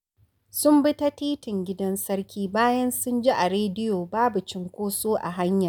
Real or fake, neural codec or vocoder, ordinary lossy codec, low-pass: real; none; none; none